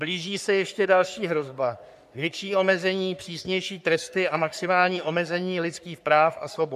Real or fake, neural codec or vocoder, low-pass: fake; codec, 44.1 kHz, 3.4 kbps, Pupu-Codec; 14.4 kHz